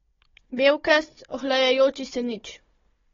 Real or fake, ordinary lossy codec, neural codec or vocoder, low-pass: fake; AAC, 24 kbps; codec, 16 kHz, 16 kbps, FunCodec, trained on Chinese and English, 50 frames a second; 7.2 kHz